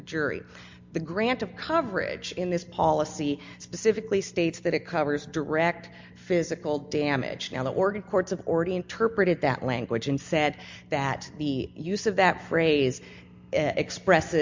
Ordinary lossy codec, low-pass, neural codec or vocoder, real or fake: MP3, 64 kbps; 7.2 kHz; none; real